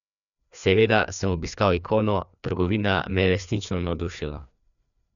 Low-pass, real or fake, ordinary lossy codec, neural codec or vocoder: 7.2 kHz; fake; none; codec, 16 kHz, 2 kbps, FreqCodec, larger model